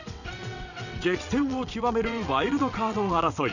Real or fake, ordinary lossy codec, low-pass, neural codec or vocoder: fake; none; 7.2 kHz; vocoder, 22.05 kHz, 80 mel bands, WaveNeXt